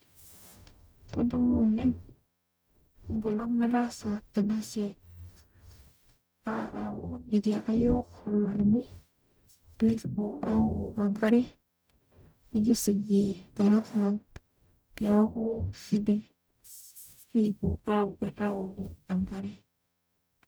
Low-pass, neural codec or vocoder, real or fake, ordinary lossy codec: none; codec, 44.1 kHz, 0.9 kbps, DAC; fake; none